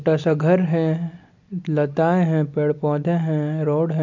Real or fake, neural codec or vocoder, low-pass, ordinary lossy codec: real; none; 7.2 kHz; MP3, 64 kbps